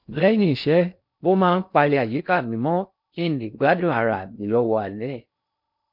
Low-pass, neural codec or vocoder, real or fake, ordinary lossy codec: 5.4 kHz; codec, 16 kHz in and 24 kHz out, 0.6 kbps, FocalCodec, streaming, 2048 codes; fake; MP3, 48 kbps